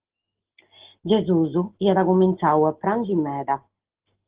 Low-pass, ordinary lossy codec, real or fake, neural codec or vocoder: 3.6 kHz; Opus, 16 kbps; real; none